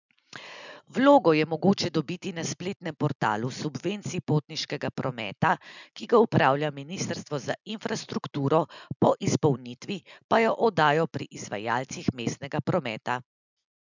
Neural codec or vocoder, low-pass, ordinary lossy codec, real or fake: none; 7.2 kHz; none; real